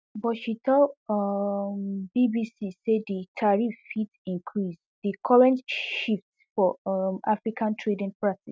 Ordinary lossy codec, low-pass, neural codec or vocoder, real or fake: none; none; none; real